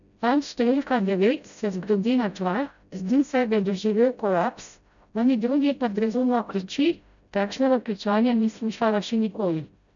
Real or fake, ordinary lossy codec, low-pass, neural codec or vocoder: fake; none; 7.2 kHz; codec, 16 kHz, 0.5 kbps, FreqCodec, smaller model